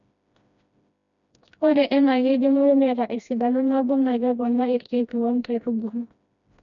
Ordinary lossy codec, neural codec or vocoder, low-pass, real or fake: none; codec, 16 kHz, 1 kbps, FreqCodec, smaller model; 7.2 kHz; fake